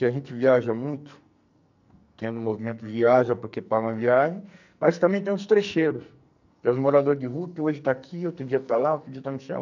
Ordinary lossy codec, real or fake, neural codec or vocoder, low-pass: none; fake; codec, 44.1 kHz, 2.6 kbps, SNAC; 7.2 kHz